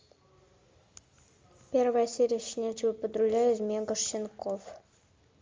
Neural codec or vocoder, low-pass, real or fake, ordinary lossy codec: none; 7.2 kHz; real; Opus, 32 kbps